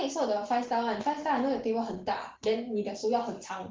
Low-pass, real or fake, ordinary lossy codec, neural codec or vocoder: 7.2 kHz; real; Opus, 16 kbps; none